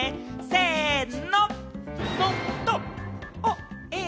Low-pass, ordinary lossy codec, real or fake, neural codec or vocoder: none; none; real; none